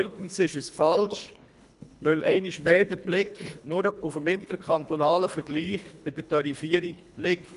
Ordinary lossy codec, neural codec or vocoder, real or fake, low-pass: AAC, 96 kbps; codec, 24 kHz, 1.5 kbps, HILCodec; fake; 10.8 kHz